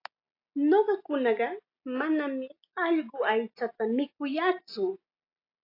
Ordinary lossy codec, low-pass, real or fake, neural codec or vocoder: AAC, 32 kbps; 5.4 kHz; real; none